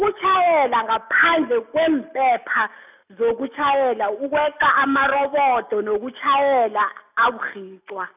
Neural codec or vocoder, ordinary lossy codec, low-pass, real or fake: none; none; 3.6 kHz; real